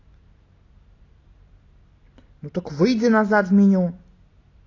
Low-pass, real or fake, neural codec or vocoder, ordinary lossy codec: 7.2 kHz; real; none; AAC, 32 kbps